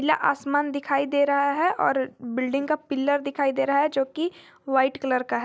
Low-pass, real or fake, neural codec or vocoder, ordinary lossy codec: none; real; none; none